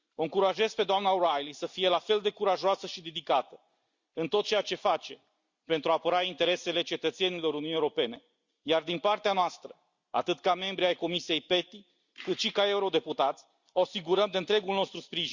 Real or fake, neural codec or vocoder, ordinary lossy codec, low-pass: real; none; Opus, 64 kbps; 7.2 kHz